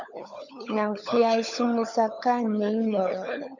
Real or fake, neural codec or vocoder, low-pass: fake; codec, 16 kHz, 16 kbps, FunCodec, trained on LibriTTS, 50 frames a second; 7.2 kHz